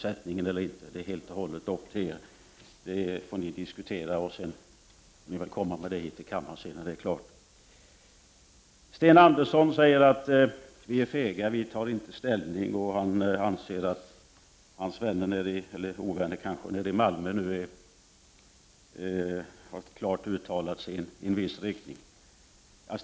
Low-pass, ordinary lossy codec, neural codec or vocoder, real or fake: none; none; none; real